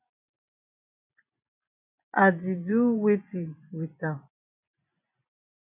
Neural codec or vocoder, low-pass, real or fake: vocoder, 24 kHz, 100 mel bands, Vocos; 3.6 kHz; fake